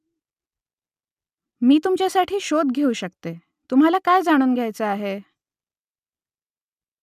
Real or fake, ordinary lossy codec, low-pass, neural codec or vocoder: real; none; 14.4 kHz; none